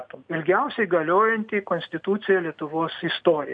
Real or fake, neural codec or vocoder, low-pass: real; none; 9.9 kHz